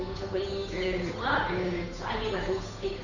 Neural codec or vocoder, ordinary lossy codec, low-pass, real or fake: codec, 16 kHz, 8 kbps, FunCodec, trained on Chinese and English, 25 frames a second; none; 7.2 kHz; fake